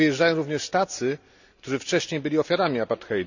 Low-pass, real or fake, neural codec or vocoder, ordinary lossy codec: 7.2 kHz; real; none; none